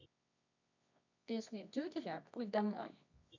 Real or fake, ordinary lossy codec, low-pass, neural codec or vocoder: fake; none; 7.2 kHz; codec, 24 kHz, 0.9 kbps, WavTokenizer, medium music audio release